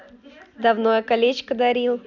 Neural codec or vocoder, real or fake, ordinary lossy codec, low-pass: none; real; none; 7.2 kHz